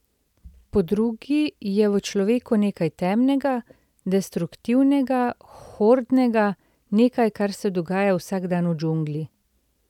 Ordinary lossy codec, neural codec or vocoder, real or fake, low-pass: none; none; real; 19.8 kHz